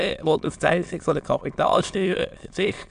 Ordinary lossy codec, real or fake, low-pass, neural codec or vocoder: AAC, 96 kbps; fake; 9.9 kHz; autoencoder, 22.05 kHz, a latent of 192 numbers a frame, VITS, trained on many speakers